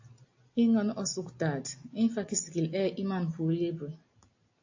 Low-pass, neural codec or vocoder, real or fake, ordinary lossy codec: 7.2 kHz; none; real; AAC, 48 kbps